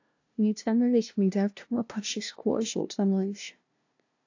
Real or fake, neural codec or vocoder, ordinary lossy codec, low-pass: fake; codec, 16 kHz, 0.5 kbps, FunCodec, trained on LibriTTS, 25 frames a second; AAC, 48 kbps; 7.2 kHz